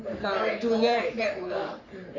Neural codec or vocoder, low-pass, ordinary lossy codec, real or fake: codec, 44.1 kHz, 3.4 kbps, Pupu-Codec; 7.2 kHz; none; fake